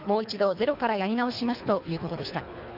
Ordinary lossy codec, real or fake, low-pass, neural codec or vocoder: none; fake; 5.4 kHz; codec, 24 kHz, 3 kbps, HILCodec